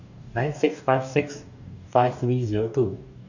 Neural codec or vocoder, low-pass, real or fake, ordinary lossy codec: codec, 44.1 kHz, 2.6 kbps, DAC; 7.2 kHz; fake; none